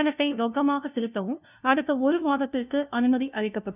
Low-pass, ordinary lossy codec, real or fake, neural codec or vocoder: 3.6 kHz; none; fake; codec, 16 kHz, 0.5 kbps, FunCodec, trained on LibriTTS, 25 frames a second